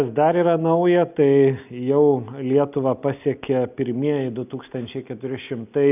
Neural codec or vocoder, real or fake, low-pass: none; real; 3.6 kHz